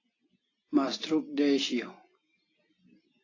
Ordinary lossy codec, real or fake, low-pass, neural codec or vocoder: AAC, 32 kbps; real; 7.2 kHz; none